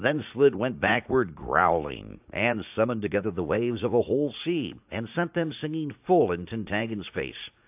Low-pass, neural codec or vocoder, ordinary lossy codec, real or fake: 3.6 kHz; vocoder, 44.1 kHz, 80 mel bands, Vocos; AAC, 32 kbps; fake